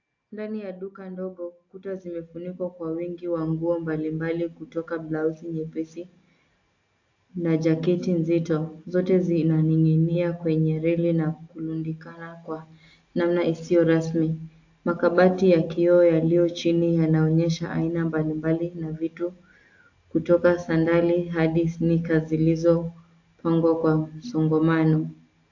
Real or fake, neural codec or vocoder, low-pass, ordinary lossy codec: real; none; 7.2 kHz; AAC, 48 kbps